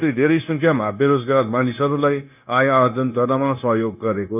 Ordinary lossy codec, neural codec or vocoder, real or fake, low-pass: none; codec, 16 kHz, 0.9 kbps, LongCat-Audio-Codec; fake; 3.6 kHz